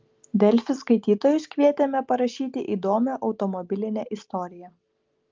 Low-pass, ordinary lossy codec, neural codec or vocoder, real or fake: 7.2 kHz; Opus, 32 kbps; none; real